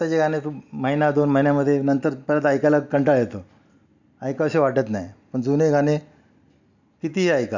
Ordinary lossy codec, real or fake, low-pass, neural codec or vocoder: none; real; 7.2 kHz; none